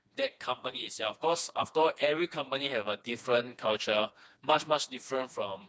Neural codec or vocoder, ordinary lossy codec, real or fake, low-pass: codec, 16 kHz, 2 kbps, FreqCodec, smaller model; none; fake; none